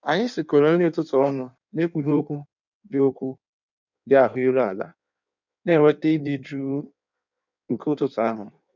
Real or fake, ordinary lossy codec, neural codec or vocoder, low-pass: fake; none; codec, 16 kHz in and 24 kHz out, 1.1 kbps, FireRedTTS-2 codec; 7.2 kHz